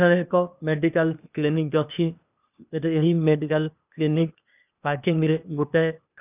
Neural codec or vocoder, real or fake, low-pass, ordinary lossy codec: codec, 16 kHz, 0.8 kbps, ZipCodec; fake; 3.6 kHz; none